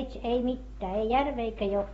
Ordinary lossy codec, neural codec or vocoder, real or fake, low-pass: AAC, 24 kbps; none; real; 19.8 kHz